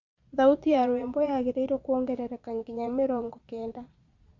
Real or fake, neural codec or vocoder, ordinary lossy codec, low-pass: fake; vocoder, 22.05 kHz, 80 mel bands, Vocos; Opus, 64 kbps; 7.2 kHz